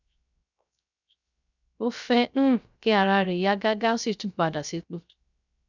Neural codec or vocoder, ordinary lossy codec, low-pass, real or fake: codec, 16 kHz, 0.3 kbps, FocalCodec; none; 7.2 kHz; fake